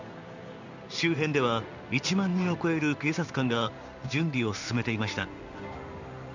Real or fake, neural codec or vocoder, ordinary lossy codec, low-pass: fake; codec, 16 kHz in and 24 kHz out, 1 kbps, XY-Tokenizer; none; 7.2 kHz